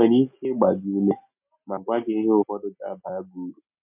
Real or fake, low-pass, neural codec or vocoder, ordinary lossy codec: real; 3.6 kHz; none; MP3, 32 kbps